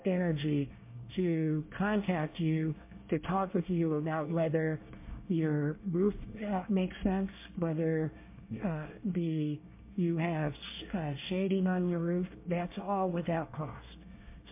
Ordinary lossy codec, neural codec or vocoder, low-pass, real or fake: MP3, 24 kbps; codec, 24 kHz, 1 kbps, SNAC; 3.6 kHz; fake